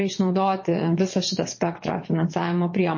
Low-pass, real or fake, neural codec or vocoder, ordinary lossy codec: 7.2 kHz; fake; vocoder, 22.05 kHz, 80 mel bands, WaveNeXt; MP3, 32 kbps